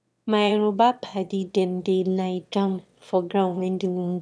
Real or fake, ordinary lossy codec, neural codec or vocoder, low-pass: fake; none; autoencoder, 22.05 kHz, a latent of 192 numbers a frame, VITS, trained on one speaker; none